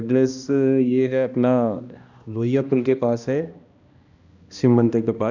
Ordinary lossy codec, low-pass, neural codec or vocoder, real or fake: none; 7.2 kHz; codec, 16 kHz, 1 kbps, X-Codec, HuBERT features, trained on balanced general audio; fake